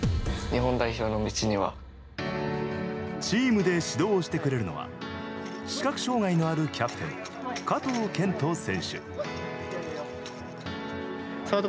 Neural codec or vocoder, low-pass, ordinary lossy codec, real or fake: none; none; none; real